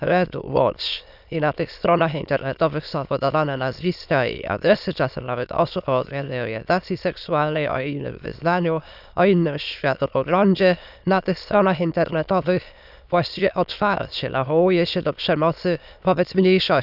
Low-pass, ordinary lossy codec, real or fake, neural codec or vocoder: 5.4 kHz; none; fake; autoencoder, 22.05 kHz, a latent of 192 numbers a frame, VITS, trained on many speakers